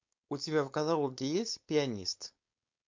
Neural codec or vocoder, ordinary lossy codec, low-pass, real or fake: codec, 16 kHz, 4.8 kbps, FACodec; MP3, 48 kbps; 7.2 kHz; fake